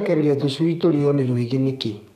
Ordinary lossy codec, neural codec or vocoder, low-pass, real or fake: none; codec, 32 kHz, 1.9 kbps, SNAC; 14.4 kHz; fake